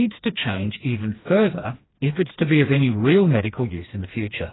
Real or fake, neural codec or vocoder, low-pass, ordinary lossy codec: fake; codec, 16 kHz, 2 kbps, FreqCodec, smaller model; 7.2 kHz; AAC, 16 kbps